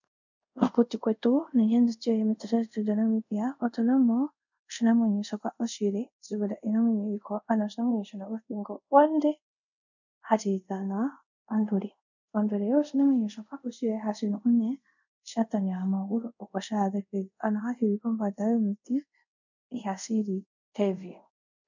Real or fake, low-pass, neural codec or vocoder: fake; 7.2 kHz; codec, 24 kHz, 0.5 kbps, DualCodec